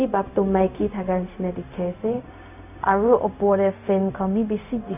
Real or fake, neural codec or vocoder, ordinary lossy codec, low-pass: fake; codec, 16 kHz, 0.4 kbps, LongCat-Audio-Codec; none; 3.6 kHz